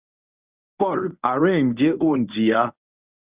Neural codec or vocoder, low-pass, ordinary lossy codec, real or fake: codec, 24 kHz, 0.9 kbps, WavTokenizer, medium speech release version 1; 3.6 kHz; Opus, 64 kbps; fake